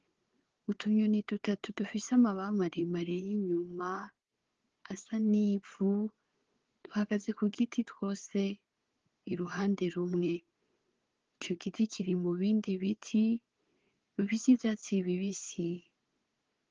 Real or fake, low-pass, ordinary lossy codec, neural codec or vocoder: fake; 7.2 kHz; Opus, 16 kbps; codec, 16 kHz, 4 kbps, FunCodec, trained on Chinese and English, 50 frames a second